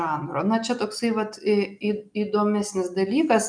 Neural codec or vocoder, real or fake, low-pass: none; real; 9.9 kHz